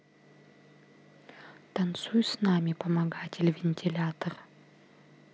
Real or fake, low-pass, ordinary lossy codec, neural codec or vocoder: real; none; none; none